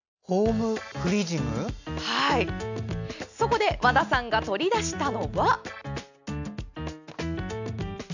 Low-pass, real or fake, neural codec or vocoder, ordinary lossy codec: 7.2 kHz; real; none; none